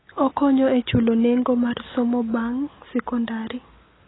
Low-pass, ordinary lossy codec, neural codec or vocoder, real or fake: 7.2 kHz; AAC, 16 kbps; none; real